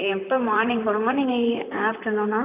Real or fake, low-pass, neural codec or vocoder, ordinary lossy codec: fake; 3.6 kHz; vocoder, 44.1 kHz, 128 mel bands, Pupu-Vocoder; none